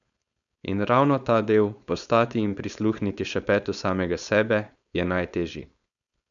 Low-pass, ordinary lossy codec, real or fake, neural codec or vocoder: 7.2 kHz; none; fake; codec, 16 kHz, 4.8 kbps, FACodec